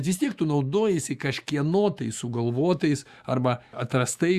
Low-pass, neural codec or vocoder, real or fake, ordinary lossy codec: 14.4 kHz; autoencoder, 48 kHz, 128 numbers a frame, DAC-VAE, trained on Japanese speech; fake; Opus, 64 kbps